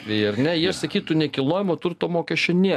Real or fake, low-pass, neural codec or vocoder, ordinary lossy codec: real; 14.4 kHz; none; AAC, 96 kbps